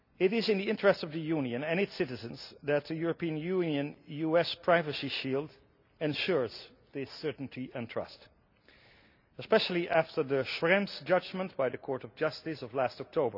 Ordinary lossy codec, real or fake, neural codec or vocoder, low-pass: none; real; none; 5.4 kHz